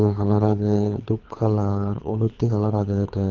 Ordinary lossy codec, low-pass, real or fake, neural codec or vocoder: Opus, 24 kbps; 7.2 kHz; fake; codec, 24 kHz, 3 kbps, HILCodec